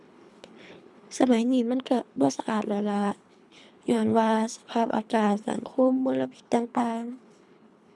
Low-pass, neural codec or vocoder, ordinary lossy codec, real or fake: none; codec, 24 kHz, 3 kbps, HILCodec; none; fake